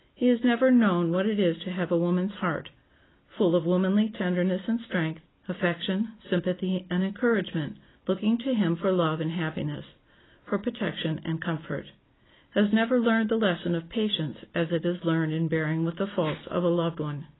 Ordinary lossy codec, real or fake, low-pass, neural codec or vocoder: AAC, 16 kbps; real; 7.2 kHz; none